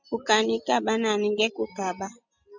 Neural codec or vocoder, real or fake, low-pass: none; real; 7.2 kHz